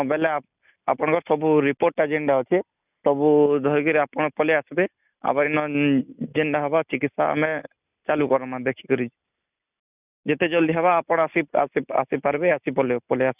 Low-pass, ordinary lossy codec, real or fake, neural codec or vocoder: 3.6 kHz; none; real; none